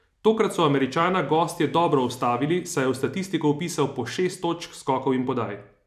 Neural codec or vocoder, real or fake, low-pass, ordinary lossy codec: vocoder, 44.1 kHz, 128 mel bands every 256 samples, BigVGAN v2; fake; 14.4 kHz; none